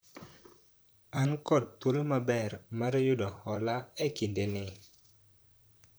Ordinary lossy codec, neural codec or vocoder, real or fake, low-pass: none; vocoder, 44.1 kHz, 128 mel bands, Pupu-Vocoder; fake; none